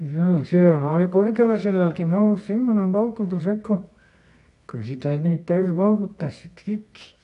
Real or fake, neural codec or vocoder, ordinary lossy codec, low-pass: fake; codec, 24 kHz, 0.9 kbps, WavTokenizer, medium music audio release; none; 10.8 kHz